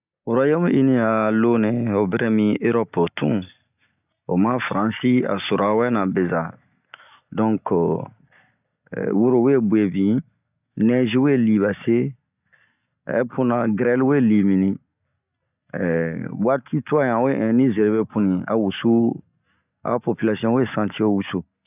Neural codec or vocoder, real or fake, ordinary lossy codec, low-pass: none; real; none; 3.6 kHz